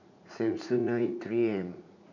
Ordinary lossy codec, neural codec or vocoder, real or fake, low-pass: none; vocoder, 44.1 kHz, 80 mel bands, Vocos; fake; 7.2 kHz